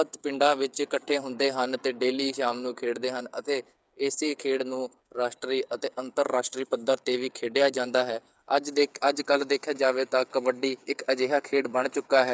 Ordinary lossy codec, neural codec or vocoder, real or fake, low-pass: none; codec, 16 kHz, 8 kbps, FreqCodec, smaller model; fake; none